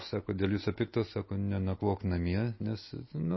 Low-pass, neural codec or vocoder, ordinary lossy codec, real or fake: 7.2 kHz; none; MP3, 24 kbps; real